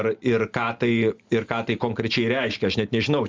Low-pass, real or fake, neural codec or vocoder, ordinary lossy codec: 7.2 kHz; real; none; Opus, 32 kbps